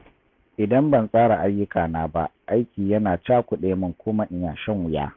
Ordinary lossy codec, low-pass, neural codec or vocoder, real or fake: MP3, 64 kbps; 7.2 kHz; none; real